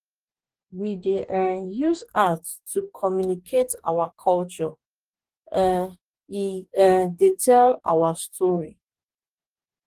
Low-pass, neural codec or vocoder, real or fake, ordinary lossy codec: 14.4 kHz; codec, 44.1 kHz, 2.6 kbps, DAC; fake; Opus, 24 kbps